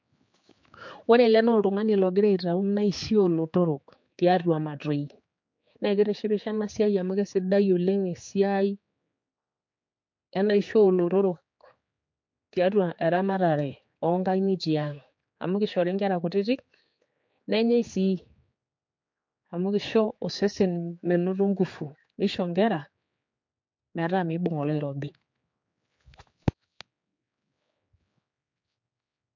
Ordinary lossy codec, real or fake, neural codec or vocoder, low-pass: MP3, 48 kbps; fake; codec, 16 kHz, 4 kbps, X-Codec, HuBERT features, trained on general audio; 7.2 kHz